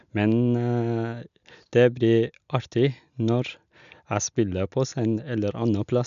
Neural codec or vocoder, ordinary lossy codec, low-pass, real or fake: none; none; 7.2 kHz; real